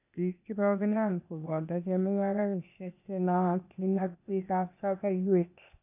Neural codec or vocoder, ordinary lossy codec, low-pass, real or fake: codec, 16 kHz, 0.8 kbps, ZipCodec; none; 3.6 kHz; fake